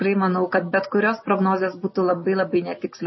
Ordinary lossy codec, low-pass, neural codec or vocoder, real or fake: MP3, 24 kbps; 7.2 kHz; none; real